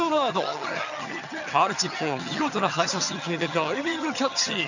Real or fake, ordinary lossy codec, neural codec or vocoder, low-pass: fake; none; vocoder, 22.05 kHz, 80 mel bands, HiFi-GAN; 7.2 kHz